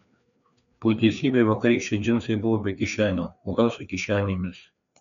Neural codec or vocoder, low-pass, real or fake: codec, 16 kHz, 2 kbps, FreqCodec, larger model; 7.2 kHz; fake